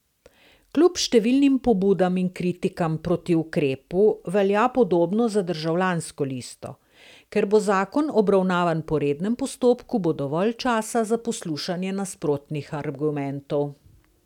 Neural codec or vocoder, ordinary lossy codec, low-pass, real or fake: none; none; 19.8 kHz; real